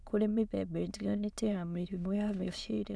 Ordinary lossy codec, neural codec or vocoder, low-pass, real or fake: none; autoencoder, 22.05 kHz, a latent of 192 numbers a frame, VITS, trained on many speakers; none; fake